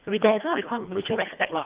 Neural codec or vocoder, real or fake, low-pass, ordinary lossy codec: codec, 24 kHz, 1.5 kbps, HILCodec; fake; 3.6 kHz; Opus, 24 kbps